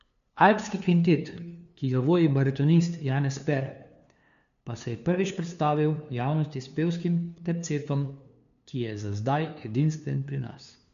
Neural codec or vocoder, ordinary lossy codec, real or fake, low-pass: codec, 16 kHz, 2 kbps, FunCodec, trained on LibriTTS, 25 frames a second; none; fake; 7.2 kHz